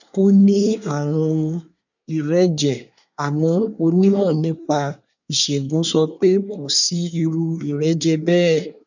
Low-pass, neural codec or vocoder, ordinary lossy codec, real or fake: 7.2 kHz; codec, 24 kHz, 1 kbps, SNAC; none; fake